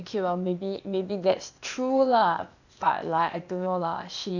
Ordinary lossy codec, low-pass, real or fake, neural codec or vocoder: none; 7.2 kHz; fake; codec, 16 kHz, 0.8 kbps, ZipCodec